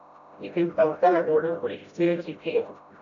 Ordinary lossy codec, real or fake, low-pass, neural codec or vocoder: AAC, 64 kbps; fake; 7.2 kHz; codec, 16 kHz, 0.5 kbps, FreqCodec, smaller model